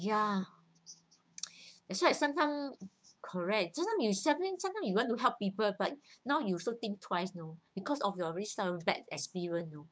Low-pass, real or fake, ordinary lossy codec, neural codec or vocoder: none; fake; none; codec, 16 kHz, 6 kbps, DAC